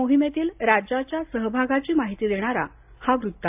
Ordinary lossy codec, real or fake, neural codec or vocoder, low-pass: AAC, 32 kbps; real; none; 3.6 kHz